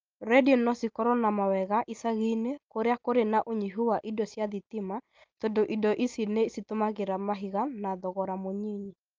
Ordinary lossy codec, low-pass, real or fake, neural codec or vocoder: Opus, 24 kbps; 7.2 kHz; real; none